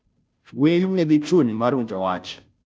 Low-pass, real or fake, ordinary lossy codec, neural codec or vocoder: none; fake; none; codec, 16 kHz, 0.5 kbps, FunCodec, trained on Chinese and English, 25 frames a second